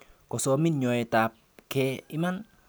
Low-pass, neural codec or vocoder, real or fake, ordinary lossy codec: none; none; real; none